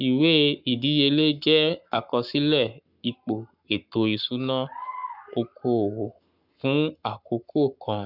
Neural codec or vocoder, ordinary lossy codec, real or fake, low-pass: codec, 44.1 kHz, 7.8 kbps, Pupu-Codec; none; fake; 5.4 kHz